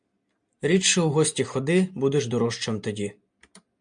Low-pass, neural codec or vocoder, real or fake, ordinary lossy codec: 9.9 kHz; none; real; MP3, 64 kbps